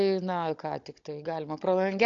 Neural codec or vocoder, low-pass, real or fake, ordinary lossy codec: codec, 16 kHz, 16 kbps, FunCodec, trained on Chinese and English, 50 frames a second; 7.2 kHz; fake; AAC, 48 kbps